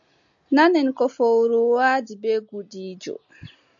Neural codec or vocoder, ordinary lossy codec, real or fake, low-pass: none; AAC, 64 kbps; real; 7.2 kHz